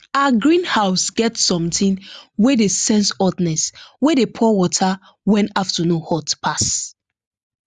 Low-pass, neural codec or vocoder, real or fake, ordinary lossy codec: 10.8 kHz; none; real; Opus, 64 kbps